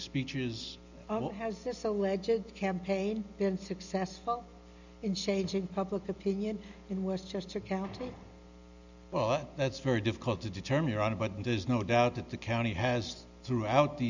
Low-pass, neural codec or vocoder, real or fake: 7.2 kHz; none; real